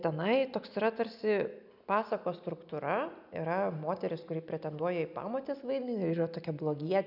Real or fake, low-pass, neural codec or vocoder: real; 5.4 kHz; none